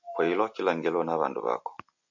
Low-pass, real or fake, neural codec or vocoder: 7.2 kHz; real; none